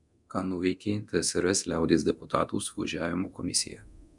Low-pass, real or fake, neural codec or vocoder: 10.8 kHz; fake; codec, 24 kHz, 0.9 kbps, DualCodec